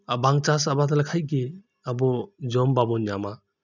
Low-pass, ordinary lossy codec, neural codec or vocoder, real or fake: 7.2 kHz; none; none; real